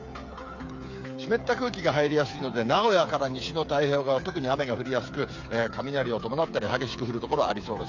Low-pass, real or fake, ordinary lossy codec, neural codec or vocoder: 7.2 kHz; fake; AAC, 48 kbps; codec, 16 kHz, 8 kbps, FreqCodec, smaller model